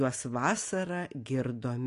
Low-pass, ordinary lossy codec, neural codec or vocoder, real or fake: 10.8 kHz; AAC, 48 kbps; none; real